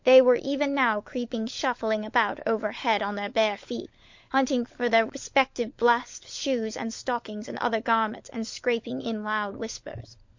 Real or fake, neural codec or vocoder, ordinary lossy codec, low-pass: fake; codec, 16 kHz, 16 kbps, FunCodec, trained on LibriTTS, 50 frames a second; MP3, 48 kbps; 7.2 kHz